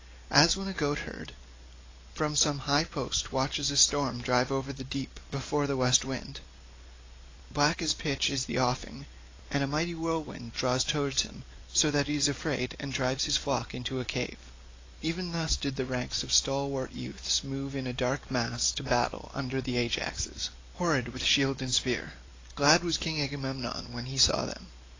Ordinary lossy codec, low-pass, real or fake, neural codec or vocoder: AAC, 32 kbps; 7.2 kHz; real; none